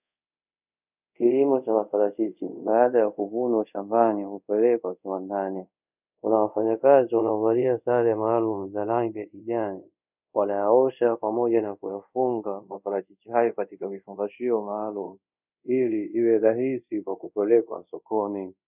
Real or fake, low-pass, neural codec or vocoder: fake; 3.6 kHz; codec, 24 kHz, 0.5 kbps, DualCodec